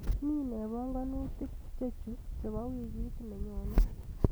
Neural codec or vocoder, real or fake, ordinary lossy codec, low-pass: none; real; none; none